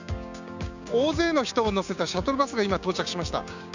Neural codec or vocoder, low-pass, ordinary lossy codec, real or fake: codec, 16 kHz, 6 kbps, DAC; 7.2 kHz; none; fake